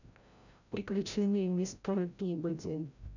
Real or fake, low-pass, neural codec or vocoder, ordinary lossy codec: fake; 7.2 kHz; codec, 16 kHz, 0.5 kbps, FreqCodec, larger model; none